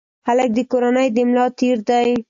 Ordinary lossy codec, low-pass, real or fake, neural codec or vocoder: AAC, 64 kbps; 7.2 kHz; real; none